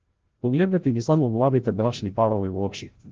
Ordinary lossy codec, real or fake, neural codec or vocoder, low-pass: Opus, 16 kbps; fake; codec, 16 kHz, 0.5 kbps, FreqCodec, larger model; 7.2 kHz